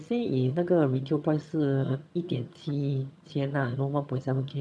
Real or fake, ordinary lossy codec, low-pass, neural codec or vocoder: fake; none; none; vocoder, 22.05 kHz, 80 mel bands, HiFi-GAN